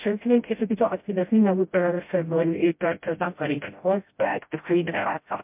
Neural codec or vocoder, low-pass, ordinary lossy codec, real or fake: codec, 16 kHz, 0.5 kbps, FreqCodec, smaller model; 3.6 kHz; MP3, 32 kbps; fake